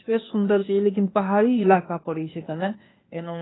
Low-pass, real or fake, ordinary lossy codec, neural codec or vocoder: 7.2 kHz; fake; AAC, 16 kbps; codec, 16 kHz, 0.8 kbps, ZipCodec